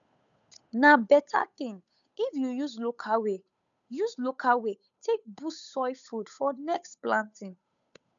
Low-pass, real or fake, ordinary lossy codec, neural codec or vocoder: 7.2 kHz; fake; none; codec, 16 kHz, 8 kbps, FunCodec, trained on Chinese and English, 25 frames a second